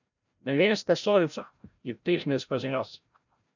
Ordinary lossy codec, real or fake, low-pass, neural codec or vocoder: none; fake; 7.2 kHz; codec, 16 kHz, 0.5 kbps, FreqCodec, larger model